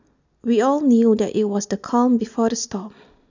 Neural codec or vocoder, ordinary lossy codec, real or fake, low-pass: none; none; real; 7.2 kHz